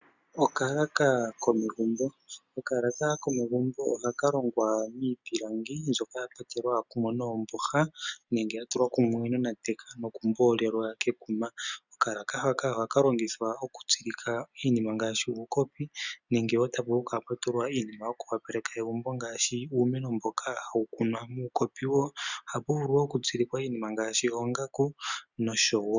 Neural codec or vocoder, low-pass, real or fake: none; 7.2 kHz; real